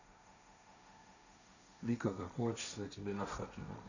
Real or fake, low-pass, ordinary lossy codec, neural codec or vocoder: fake; 7.2 kHz; none; codec, 16 kHz, 1.1 kbps, Voila-Tokenizer